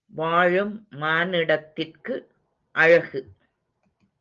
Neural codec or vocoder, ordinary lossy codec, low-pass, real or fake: none; Opus, 32 kbps; 7.2 kHz; real